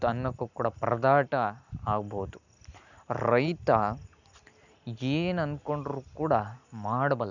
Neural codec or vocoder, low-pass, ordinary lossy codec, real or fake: none; 7.2 kHz; none; real